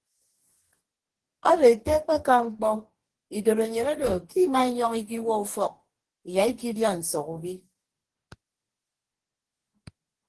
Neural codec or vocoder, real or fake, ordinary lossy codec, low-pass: codec, 44.1 kHz, 2.6 kbps, DAC; fake; Opus, 16 kbps; 10.8 kHz